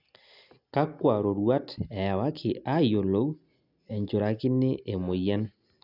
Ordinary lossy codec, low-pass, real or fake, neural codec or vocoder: none; 5.4 kHz; real; none